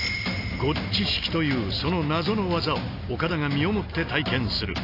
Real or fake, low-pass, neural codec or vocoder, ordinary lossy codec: real; 5.4 kHz; none; AAC, 32 kbps